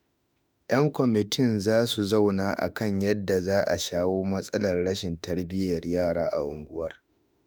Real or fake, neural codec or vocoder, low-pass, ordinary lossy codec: fake; autoencoder, 48 kHz, 32 numbers a frame, DAC-VAE, trained on Japanese speech; none; none